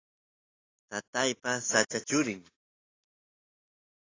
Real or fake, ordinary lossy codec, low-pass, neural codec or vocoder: real; AAC, 32 kbps; 7.2 kHz; none